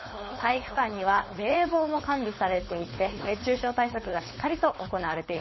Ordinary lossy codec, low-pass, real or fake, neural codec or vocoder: MP3, 24 kbps; 7.2 kHz; fake; codec, 16 kHz, 4.8 kbps, FACodec